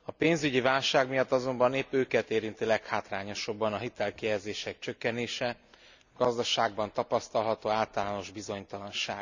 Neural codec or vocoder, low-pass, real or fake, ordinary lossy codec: none; 7.2 kHz; real; none